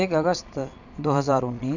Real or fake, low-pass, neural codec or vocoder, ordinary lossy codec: fake; 7.2 kHz; vocoder, 22.05 kHz, 80 mel bands, Vocos; none